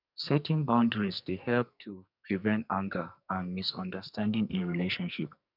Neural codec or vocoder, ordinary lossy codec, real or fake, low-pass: codec, 44.1 kHz, 2.6 kbps, SNAC; none; fake; 5.4 kHz